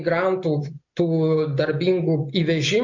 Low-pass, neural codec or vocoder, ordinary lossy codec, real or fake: 7.2 kHz; none; MP3, 64 kbps; real